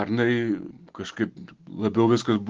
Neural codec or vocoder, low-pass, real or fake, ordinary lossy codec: none; 7.2 kHz; real; Opus, 16 kbps